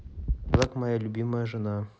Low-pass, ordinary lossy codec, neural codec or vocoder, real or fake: none; none; none; real